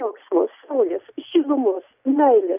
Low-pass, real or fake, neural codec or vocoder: 3.6 kHz; real; none